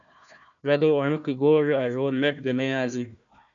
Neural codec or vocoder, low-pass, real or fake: codec, 16 kHz, 1 kbps, FunCodec, trained on Chinese and English, 50 frames a second; 7.2 kHz; fake